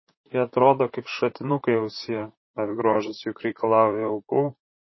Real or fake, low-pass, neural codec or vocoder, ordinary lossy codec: fake; 7.2 kHz; vocoder, 44.1 kHz, 128 mel bands, Pupu-Vocoder; MP3, 24 kbps